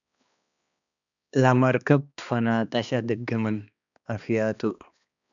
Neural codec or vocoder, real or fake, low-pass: codec, 16 kHz, 2 kbps, X-Codec, HuBERT features, trained on balanced general audio; fake; 7.2 kHz